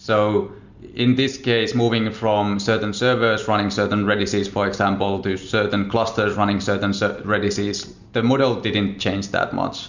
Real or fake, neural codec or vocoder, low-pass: real; none; 7.2 kHz